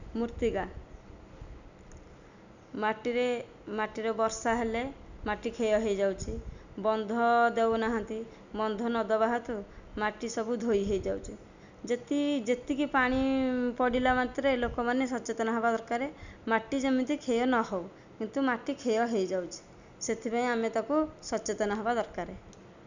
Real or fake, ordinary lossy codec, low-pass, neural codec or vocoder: real; none; 7.2 kHz; none